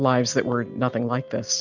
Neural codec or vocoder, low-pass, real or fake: none; 7.2 kHz; real